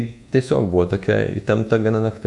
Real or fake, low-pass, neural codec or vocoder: fake; 10.8 kHz; codec, 24 kHz, 1.2 kbps, DualCodec